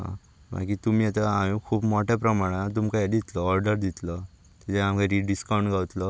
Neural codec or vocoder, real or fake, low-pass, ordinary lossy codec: none; real; none; none